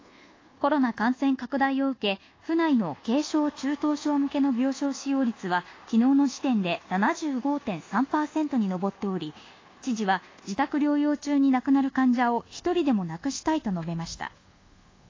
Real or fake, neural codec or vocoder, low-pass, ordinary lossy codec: fake; codec, 24 kHz, 1.2 kbps, DualCodec; 7.2 kHz; AAC, 32 kbps